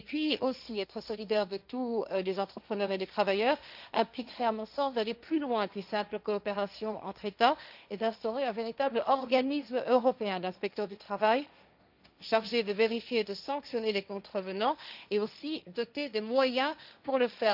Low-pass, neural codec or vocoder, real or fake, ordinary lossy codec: 5.4 kHz; codec, 16 kHz, 1.1 kbps, Voila-Tokenizer; fake; none